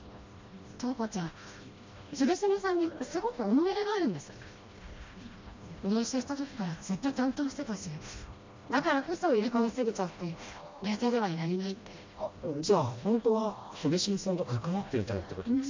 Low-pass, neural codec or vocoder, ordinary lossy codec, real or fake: 7.2 kHz; codec, 16 kHz, 1 kbps, FreqCodec, smaller model; MP3, 48 kbps; fake